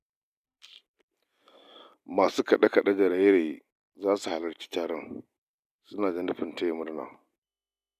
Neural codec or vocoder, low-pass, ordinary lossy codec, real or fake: none; 14.4 kHz; none; real